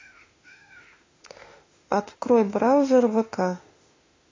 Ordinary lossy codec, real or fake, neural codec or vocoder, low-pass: AAC, 32 kbps; fake; autoencoder, 48 kHz, 32 numbers a frame, DAC-VAE, trained on Japanese speech; 7.2 kHz